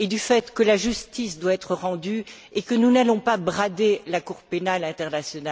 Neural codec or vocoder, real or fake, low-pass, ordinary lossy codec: none; real; none; none